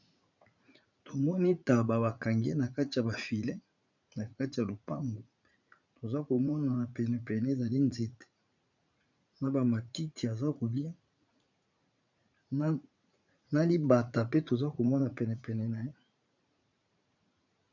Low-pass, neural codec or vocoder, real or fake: 7.2 kHz; vocoder, 44.1 kHz, 80 mel bands, Vocos; fake